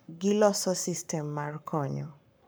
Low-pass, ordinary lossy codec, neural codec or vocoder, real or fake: none; none; codec, 44.1 kHz, 7.8 kbps, Pupu-Codec; fake